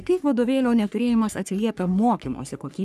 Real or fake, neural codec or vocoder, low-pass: fake; codec, 44.1 kHz, 3.4 kbps, Pupu-Codec; 14.4 kHz